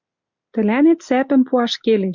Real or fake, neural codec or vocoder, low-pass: real; none; 7.2 kHz